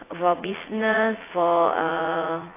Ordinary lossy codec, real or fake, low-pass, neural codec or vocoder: AAC, 16 kbps; fake; 3.6 kHz; vocoder, 44.1 kHz, 80 mel bands, Vocos